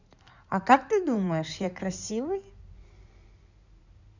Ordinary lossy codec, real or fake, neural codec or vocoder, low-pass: none; fake; codec, 16 kHz in and 24 kHz out, 2.2 kbps, FireRedTTS-2 codec; 7.2 kHz